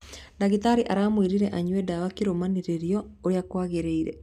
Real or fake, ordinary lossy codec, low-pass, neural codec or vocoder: real; none; 14.4 kHz; none